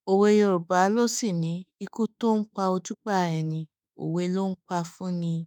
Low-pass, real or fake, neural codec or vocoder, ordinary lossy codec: 19.8 kHz; fake; autoencoder, 48 kHz, 32 numbers a frame, DAC-VAE, trained on Japanese speech; none